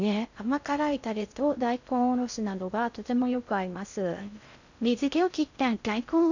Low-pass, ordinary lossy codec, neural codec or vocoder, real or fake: 7.2 kHz; none; codec, 16 kHz in and 24 kHz out, 0.6 kbps, FocalCodec, streaming, 2048 codes; fake